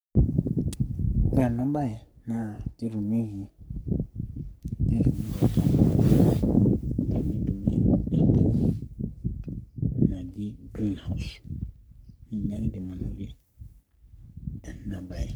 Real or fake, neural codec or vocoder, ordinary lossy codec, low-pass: fake; codec, 44.1 kHz, 3.4 kbps, Pupu-Codec; none; none